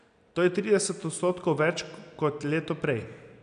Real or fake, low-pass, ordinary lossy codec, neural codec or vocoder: real; 9.9 kHz; none; none